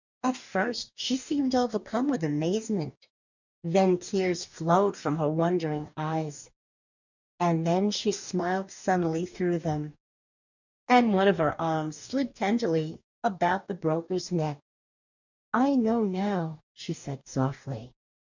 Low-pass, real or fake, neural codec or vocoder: 7.2 kHz; fake; codec, 44.1 kHz, 2.6 kbps, DAC